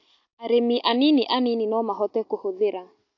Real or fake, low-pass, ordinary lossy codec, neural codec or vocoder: real; 7.2 kHz; AAC, 48 kbps; none